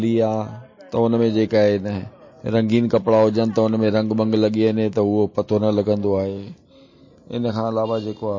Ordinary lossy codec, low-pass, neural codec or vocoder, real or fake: MP3, 32 kbps; 7.2 kHz; none; real